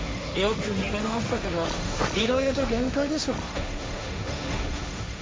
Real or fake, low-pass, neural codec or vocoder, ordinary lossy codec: fake; none; codec, 16 kHz, 1.1 kbps, Voila-Tokenizer; none